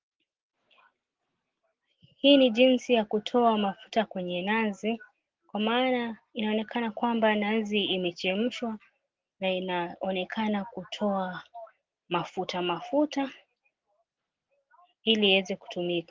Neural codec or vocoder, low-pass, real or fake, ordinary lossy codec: none; 7.2 kHz; real; Opus, 16 kbps